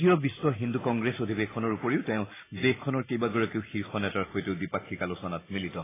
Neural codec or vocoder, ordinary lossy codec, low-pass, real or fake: none; AAC, 16 kbps; 3.6 kHz; real